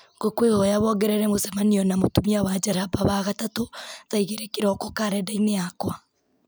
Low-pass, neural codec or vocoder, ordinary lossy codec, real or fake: none; none; none; real